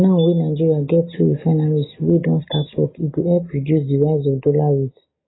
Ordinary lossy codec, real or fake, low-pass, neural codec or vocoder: AAC, 16 kbps; real; 7.2 kHz; none